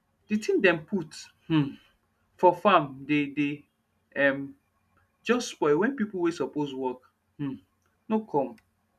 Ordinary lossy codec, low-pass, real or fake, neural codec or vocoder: none; 14.4 kHz; real; none